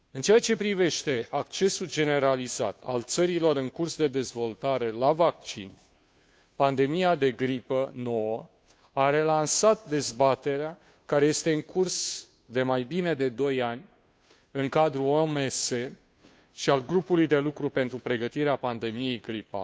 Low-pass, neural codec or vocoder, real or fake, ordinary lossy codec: none; codec, 16 kHz, 2 kbps, FunCodec, trained on Chinese and English, 25 frames a second; fake; none